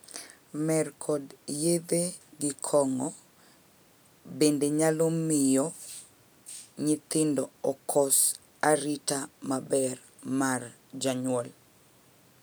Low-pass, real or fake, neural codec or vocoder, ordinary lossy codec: none; real; none; none